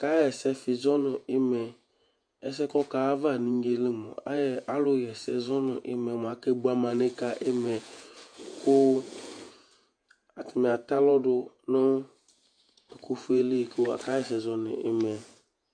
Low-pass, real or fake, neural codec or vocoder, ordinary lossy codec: 9.9 kHz; fake; vocoder, 48 kHz, 128 mel bands, Vocos; MP3, 64 kbps